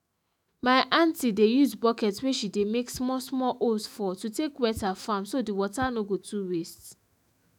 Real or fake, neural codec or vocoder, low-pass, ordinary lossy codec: fake; autoencoder, 48 kHz, 128 numbers a frame, DAC-VAE, trained on Japanese speech; 19.8 kHz; none